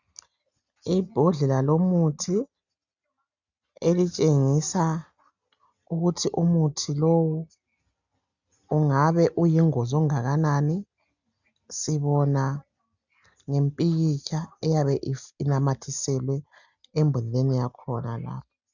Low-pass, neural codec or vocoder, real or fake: 7.2 kHz; none; real